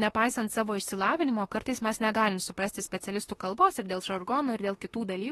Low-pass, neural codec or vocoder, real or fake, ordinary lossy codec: 19.8 kHz; autoencoder, 48 kHz, 32 numbers a frame, DAC-VAE, trained on Japanese speech; fake; AAC, 32 kbps